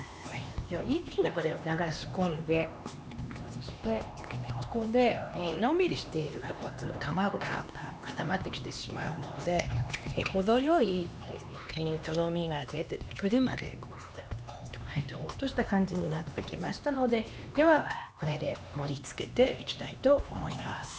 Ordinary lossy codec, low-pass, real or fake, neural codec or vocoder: none; none; fake; codec, 16 kHz, 2 kbps, X-Codec, HuBERT features, trained on LibriSpeech